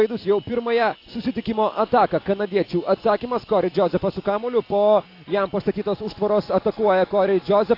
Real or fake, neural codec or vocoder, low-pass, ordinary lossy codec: real; none; 5.4 kHz; AAC, 32 kbps